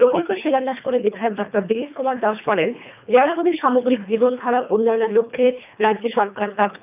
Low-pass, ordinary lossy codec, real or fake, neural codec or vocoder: 3.6 kHz; none; fake; codec, 24 kHz, 1.5 kbps, HILCodec